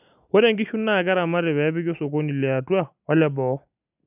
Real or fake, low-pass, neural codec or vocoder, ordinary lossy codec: real; 3.6 kHz; none; none